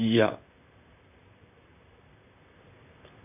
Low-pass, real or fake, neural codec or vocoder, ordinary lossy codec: 3.6 kHz; fake; vocoder, 44.1 kHz, 128 mel bands, Pupu-Vocoder; none